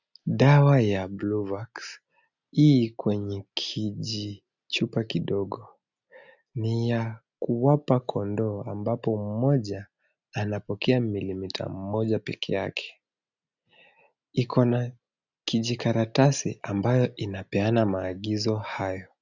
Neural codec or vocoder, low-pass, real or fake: none; 7.2 kHz; real